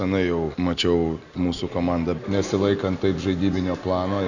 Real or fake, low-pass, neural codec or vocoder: real; 7.2 kHz; none